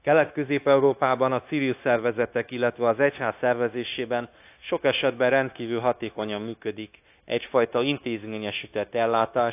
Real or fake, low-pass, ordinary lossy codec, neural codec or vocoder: fake; 3.6 kHz; AAC, 32 kbps; codec, 16 kHz, 0.9 kbps, LongCat-Audio-Codec